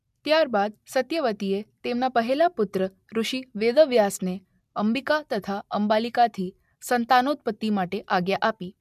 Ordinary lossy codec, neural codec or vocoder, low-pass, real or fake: MP3, 96 kbps; none; 14.4 kHz; real